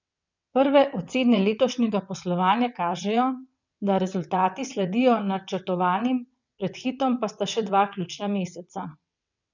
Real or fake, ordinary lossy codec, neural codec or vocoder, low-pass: fake; none; vocoder, 22.05 kHz, 80 mel bands, WaveNeXt; 7.2 kHz